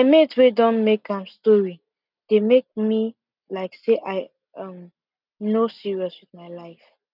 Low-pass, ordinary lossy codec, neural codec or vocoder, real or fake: 5.4 kHz; none; none; real